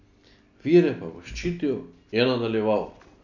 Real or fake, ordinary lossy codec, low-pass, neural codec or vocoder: real; none; 7.2 kHz; none